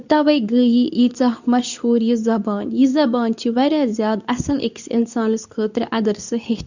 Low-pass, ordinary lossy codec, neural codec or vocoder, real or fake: 7.2 kHz; none; codec, 24 kHz, 0.9 kbps, WavTokenizer, medium speech release version 2; fake